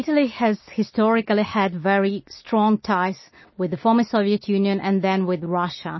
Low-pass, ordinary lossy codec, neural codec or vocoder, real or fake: 7.2 kHz; MP3, 24 kbps; none; real